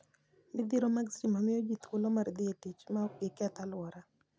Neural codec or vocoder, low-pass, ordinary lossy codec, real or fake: none; none; none; real